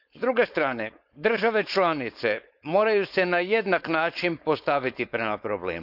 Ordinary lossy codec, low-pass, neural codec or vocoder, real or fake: none; 5.4 kHz; codec, 16 kHz, 4.8 kbps, FACodec; fake